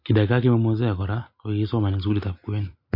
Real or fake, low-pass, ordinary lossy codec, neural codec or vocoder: real; 5.4 kHz; MP3, 32 kbps; none